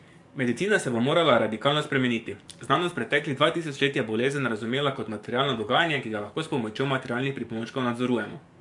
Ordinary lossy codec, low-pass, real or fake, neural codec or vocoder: MP3, 64 kbps; 10.8 kHz; fake; codec, 44.1 kHz, 7.8 kbps, DAC